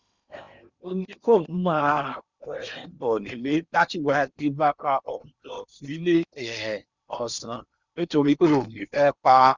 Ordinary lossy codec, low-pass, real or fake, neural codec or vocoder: Opus, 64 kbps; 7.2 kHz; fake; codec, 16 kHz in and 24 kHz out, 0.8 kbps, FocalCodec, streaming, 65536 codes